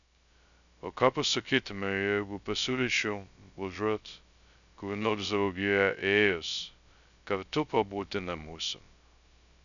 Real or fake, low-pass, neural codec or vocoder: fake; 7.2 kHz; codec, 16 kHz, 0.2 kbps, FocalCodec